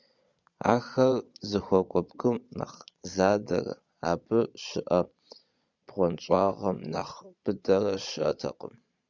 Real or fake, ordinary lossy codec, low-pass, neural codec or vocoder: fake; Opus, 64 kbps; 7.2 kHz; vocoder, 22.05 kHz, 80 mel bands, Vocos